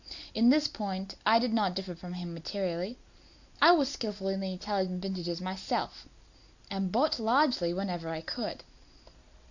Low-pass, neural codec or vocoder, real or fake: 7.2 kHz; none; real